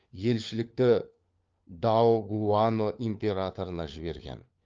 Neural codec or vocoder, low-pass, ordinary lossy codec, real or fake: codec, 16 kHz, 4 kbps, FunCodec, trained on LibriTTS, 50 frames a second; 7.2 kHz; Opus, 24 kbps; fake